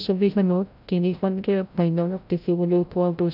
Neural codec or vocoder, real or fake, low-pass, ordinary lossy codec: codec, 16 kHz, 0.5 kbps, FreqCodec, larger model; fake; 5.4 kHz; none